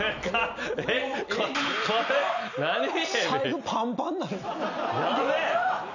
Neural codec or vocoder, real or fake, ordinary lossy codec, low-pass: none; real; none; 7.2 kHz